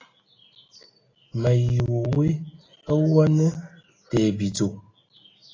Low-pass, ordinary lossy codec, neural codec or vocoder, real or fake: 7.2 kHz; AAC, 32 kbps; none; real